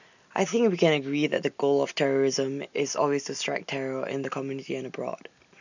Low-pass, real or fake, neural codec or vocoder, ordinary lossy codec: 7.2 kHz; real; none; none